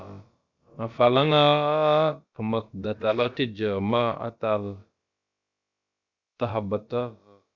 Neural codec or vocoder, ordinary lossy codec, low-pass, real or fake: codec, 16 kHz, about 1 kbps, DyCAST, with the encoder's durations; Opus, 64 kbps; 7.2 kHz; fake